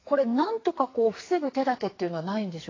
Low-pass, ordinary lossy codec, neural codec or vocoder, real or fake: 7.2 kHz; AAC, 32 kbps; codec, 44.1 kHz, 2.6 kbps, SNAC; fake